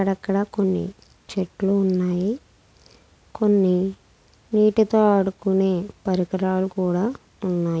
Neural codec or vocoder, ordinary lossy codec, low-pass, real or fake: none; none; none; real